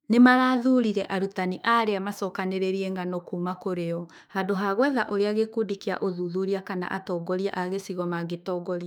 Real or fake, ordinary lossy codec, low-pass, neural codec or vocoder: fake; none; 19.8 kHz; autoencoder, 48 kHz, 32 numbers a frame, DAC-VAE, trained on Japanese speech